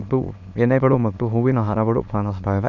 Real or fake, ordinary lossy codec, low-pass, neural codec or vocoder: fake; none; 7.2 kHz; autoencoder, 22.05 kHz, a latent of 192 numbers a frame, VITS, trained on many speakers